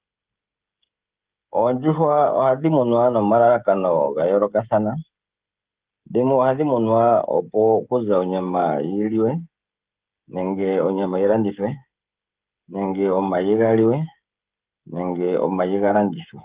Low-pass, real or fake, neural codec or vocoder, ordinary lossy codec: 3.6 kHz; fake; codec, 16 kHz, 16 kbps, FreqCodec, smaller model; Opus, 32 kbps